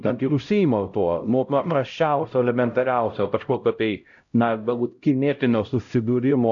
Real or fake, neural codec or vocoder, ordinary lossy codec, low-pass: fake; codec, 16 kHz, 0.5 kbps, X-Codec, HuBERT features, trained on LibriSpeech; AAC, 64 kbps; 7.2 kHz